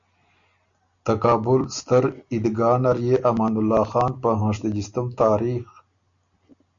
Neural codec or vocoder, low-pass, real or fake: none; 7.2 kHz; real